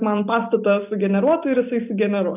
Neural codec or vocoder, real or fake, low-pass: none; real; 3.6 kHz